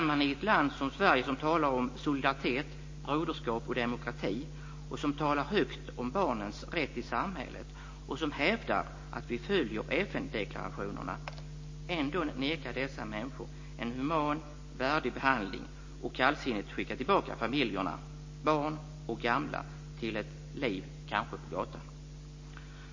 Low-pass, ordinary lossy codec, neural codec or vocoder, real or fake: 7.2 kHz; MP3, 32 kbps; none; real